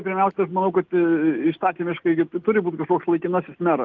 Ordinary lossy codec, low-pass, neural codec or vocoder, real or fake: Opus, 32 kbps; 7.2 kHz; none; real